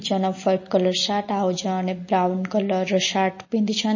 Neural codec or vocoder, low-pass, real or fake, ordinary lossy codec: none; 7.2 kHz; real; MP3, 32 kbps